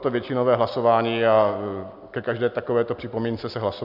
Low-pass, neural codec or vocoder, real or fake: 5.4 kHz; none; real